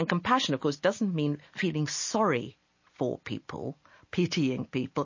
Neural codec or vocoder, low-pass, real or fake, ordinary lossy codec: none; 7.2 kHz; real; MP3, 32 kbps